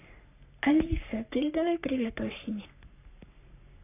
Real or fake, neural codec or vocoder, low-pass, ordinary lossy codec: fake; codec, 44.1 kHz, 3.4 kbps, Pupu-Codec; 3.6 kHz; none